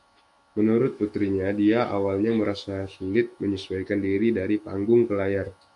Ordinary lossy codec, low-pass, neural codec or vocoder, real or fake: MP3, 64 kbps; 10.8 kHz; autoencoder, 48 kHz, 128 numbers a frame, DAC-VAE, trained on Japanese speech; fake